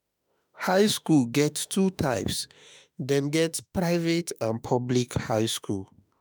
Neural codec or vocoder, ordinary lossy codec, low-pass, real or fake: autoencoder, 48 kHz, 32 numbers a frame, DAC-VAE, trained on Japanese speech; none; none; fake